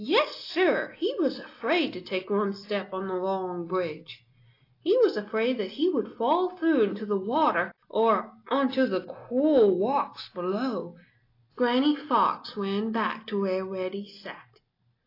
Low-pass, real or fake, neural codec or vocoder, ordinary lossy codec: 5.4 kHz; real; none; AAC, 32 kbps